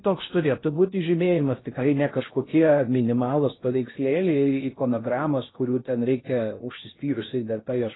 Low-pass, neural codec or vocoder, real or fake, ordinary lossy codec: 7.2 kHz; codec, 16 kHz in and 24 kHz out, 0.6 kbps, FocalCodec, streaming, 4096 codes; fake; AAC, 16 kbps